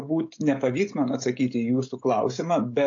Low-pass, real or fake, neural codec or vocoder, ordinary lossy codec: 7.2 kHz; fake; codec, 16 kHz, 16 kbps, FreqCodec, smaller model; AAC, 48 kbps